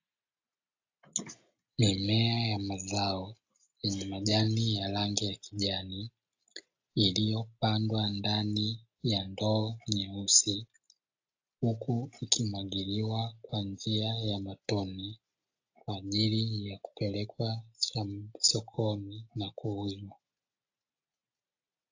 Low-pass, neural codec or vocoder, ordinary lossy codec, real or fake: 7.2 kHz; none; AAC, 48 kbps; real